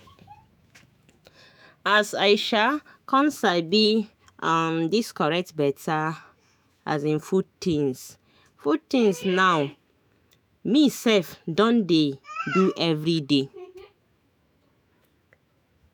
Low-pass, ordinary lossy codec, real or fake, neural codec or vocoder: none; none; fake; autoencoder, 48 kHz, 128 numbers a frame, DAC-VAE, trained on Japanese speech